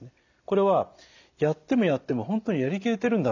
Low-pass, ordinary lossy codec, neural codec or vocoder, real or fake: 7.2 kHz; none; none; real